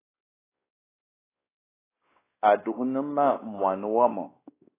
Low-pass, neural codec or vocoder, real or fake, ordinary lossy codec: 3.6 kHz; codec, 16 kHz, 2 kbps, X-Codec, WavLM features, trained on Multilingual LibriSpeech; fake; AAC, 16 kbps